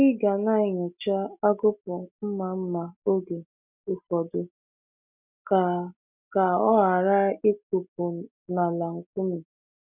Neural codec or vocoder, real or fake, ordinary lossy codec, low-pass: none; real; none; 3.6 kHz